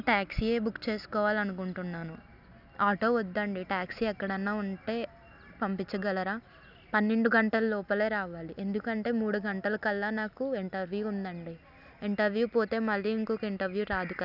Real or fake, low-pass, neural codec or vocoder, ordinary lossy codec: real; 5.4 kHz; none; none